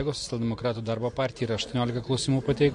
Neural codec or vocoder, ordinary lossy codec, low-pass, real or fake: none; MP3, 48 kbps; 10.8 kHz; real